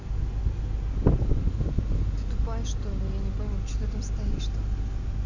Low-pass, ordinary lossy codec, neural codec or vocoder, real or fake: 7.2 kHz; none; none; real